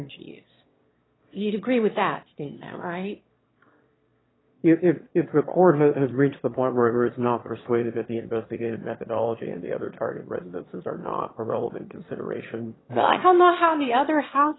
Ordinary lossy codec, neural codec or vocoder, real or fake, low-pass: AAC, 16 kbps; autoencoder, 22.05 kHz, a latent of 192 numbers a frame, VITS, trained on one speaker; fake; 7.2 kHz